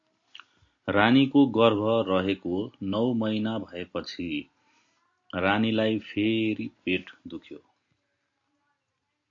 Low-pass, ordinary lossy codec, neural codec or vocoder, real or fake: 7.2 kHz; AAC, 48 kbps; none; real